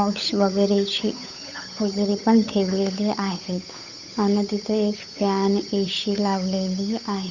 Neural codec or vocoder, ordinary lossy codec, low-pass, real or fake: codec, 16 kHz, 8 kbps, FunCodec, trained on Chinese and English, 25 frames a second; none; 7.2 kHz; fake